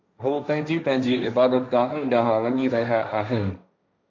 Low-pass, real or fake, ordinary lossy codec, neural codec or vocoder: 7.2 kHz; fake; MP3, 64 kbps; codec, 16 kHz, 1.1 kbps, Voila-Tokenizer